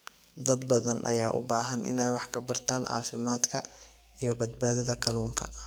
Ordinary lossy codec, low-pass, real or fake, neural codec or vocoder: none; none; fake; codec, 44.1 kHz, 2.6 kbps, SNAC